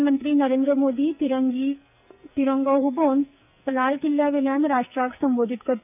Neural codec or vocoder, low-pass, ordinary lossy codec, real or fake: codec, 44.1 kHz, 2.6 kbps, SNAC; 3.6 kHz; none; fake